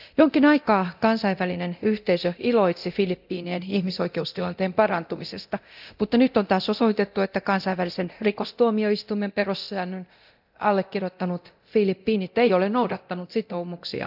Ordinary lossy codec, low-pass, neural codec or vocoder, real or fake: none; 5.4 kHz; codec, 24 kHz, 0.9 kbps, DualCodec; fake